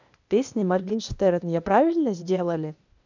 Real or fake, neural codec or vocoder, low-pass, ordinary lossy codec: fake; codec, 16 kHz, 0.8 kbps, ZipCodec; 7.2 kHz; none